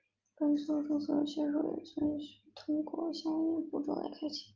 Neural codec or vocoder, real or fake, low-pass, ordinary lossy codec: none; real; 7.2 kHz; Opus, 24 kbps